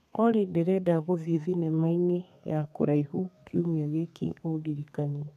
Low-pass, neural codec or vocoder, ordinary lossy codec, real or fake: 14.4 kHz; codec, 32 kHz, 1.9 kbps, SNAC; none; fake